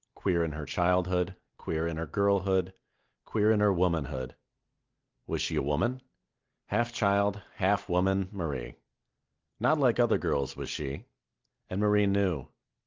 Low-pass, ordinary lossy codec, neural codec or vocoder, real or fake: 7.2 kHz; Opus, 24 kbps; none; real